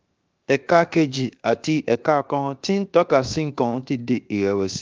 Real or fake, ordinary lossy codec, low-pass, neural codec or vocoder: fake; Opus, 24 kbps; 7.2 kHz; codec, 16 kHz, 0.7 kbps, FocalCodec